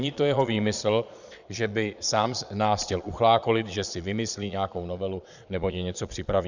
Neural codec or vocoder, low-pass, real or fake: vocoder, 22.05 kHz, 80 mel bands, Vocos; 7.2 kHz; fake